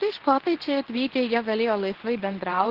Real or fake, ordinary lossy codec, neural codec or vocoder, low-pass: fake; Opus, 16 kbps; codec, 24 kHz, 0.9 kbps, WavTokenizer, medium speech release version 2; 5.4 kHz